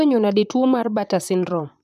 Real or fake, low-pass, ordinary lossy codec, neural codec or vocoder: fake; 14.4 kHz; none; vocoder, 44.1 kHz, 128 mel bands, Pupu-Vocoder